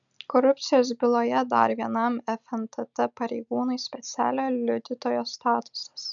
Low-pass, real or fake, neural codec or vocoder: 7.2 kHz; real; none